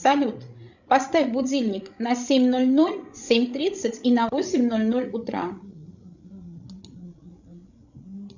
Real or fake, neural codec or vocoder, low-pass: fake; codec, 16 kHz, 16 kbps, FreqCodec, larger model; 7.2 kHz